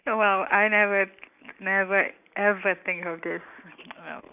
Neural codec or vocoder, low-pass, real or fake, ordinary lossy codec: none; 3.6 kHz; real; none